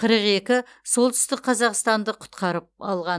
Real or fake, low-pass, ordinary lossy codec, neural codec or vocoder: real; none; none; none